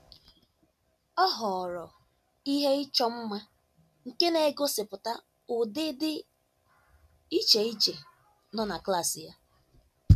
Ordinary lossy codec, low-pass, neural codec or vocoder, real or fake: none; 14.4 kHz; none; real